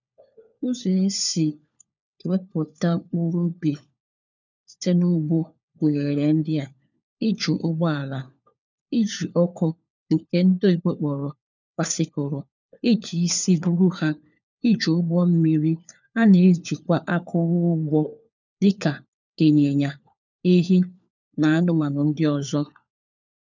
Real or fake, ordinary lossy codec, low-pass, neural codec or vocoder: fake; none; 7.2 kHz; codec, 16 kHz, 4 kbps, FunCodec, trained on LibriTTS, 50 frames a second